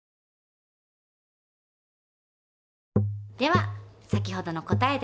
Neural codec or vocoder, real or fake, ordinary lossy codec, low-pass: none; real; none; none